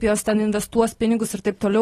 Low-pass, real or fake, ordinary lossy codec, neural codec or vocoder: 19.8 kHz; real; AAC, 32 kbps; none